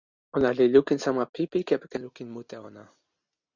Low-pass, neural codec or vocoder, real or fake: 7.2 kHz; none; real